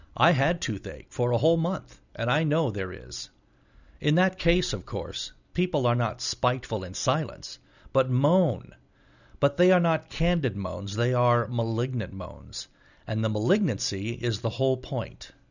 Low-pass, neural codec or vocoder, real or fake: 7.2 kHz; none; real